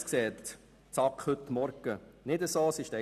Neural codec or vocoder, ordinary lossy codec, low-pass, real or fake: none; none; 14.4 kHz; real